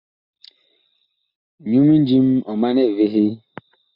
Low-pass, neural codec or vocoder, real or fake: 5.4 kHz; none; real